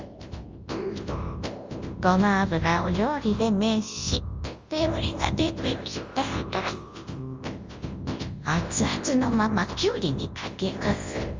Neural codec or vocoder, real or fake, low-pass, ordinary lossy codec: codec, 24 kHz, 0.9 kbps, WavTokenizer, large speech release; fake; 7.2 kHz; Opus, 32 kbps